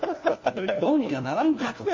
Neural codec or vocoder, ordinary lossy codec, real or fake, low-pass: codec, 16 kHz, 1 kbps, FunCodec, trained on LibriTTS, 50 frames a second; MP3, 32 kbps; fake; 7.2 kHz